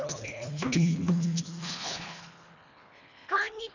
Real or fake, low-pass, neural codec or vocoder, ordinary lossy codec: fake; 7.2 kHz; codec, 24 kHz, 1.5 kbps, HILCodec; none